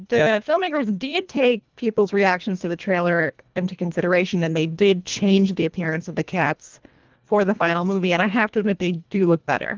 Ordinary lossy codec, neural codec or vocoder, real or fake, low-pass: Opus, 32 kbps; codec, 24 kHz, 1.5 kbps, HILCodec; fake; 7.2 kHz